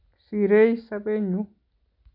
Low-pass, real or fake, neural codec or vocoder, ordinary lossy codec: 5.4 kHz; real; none; none